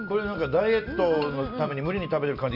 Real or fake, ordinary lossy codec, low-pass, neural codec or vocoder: real; none; 5.4 kHz; none